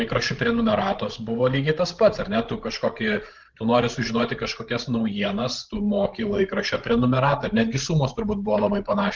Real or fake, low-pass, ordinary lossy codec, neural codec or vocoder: fake; 7.2 kHz; Opus, 16 kbps; codec, 16 kHz, 16 kbps, FreqCodec, larger model